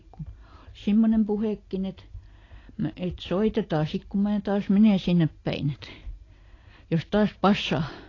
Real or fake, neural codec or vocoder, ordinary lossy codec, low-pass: real; none; AAC, 32 kbps; 7.2 kHz